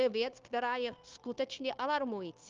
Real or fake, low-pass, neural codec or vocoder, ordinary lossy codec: fake; 7.2 kHz; codec, 16 kHz, 0.9 kbps, LongCat-Audio-Codec; Opus, 32 kbps